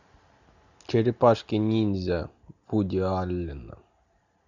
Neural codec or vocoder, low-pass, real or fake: none; 7.2 kHz; real